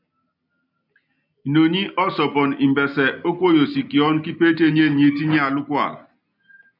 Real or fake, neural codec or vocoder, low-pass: real; none; 5.4 kHz